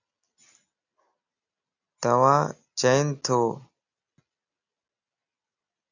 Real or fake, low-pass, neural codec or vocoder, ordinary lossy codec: real; 7.2 kHz; none; AAC, 48 kbps